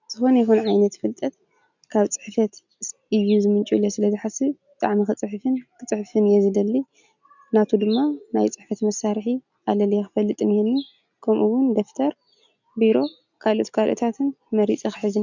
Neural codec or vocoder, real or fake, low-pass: none; real; 7.2 kHz